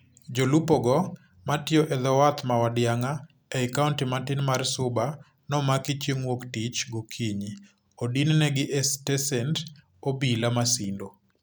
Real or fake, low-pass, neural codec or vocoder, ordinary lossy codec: real; none; none; none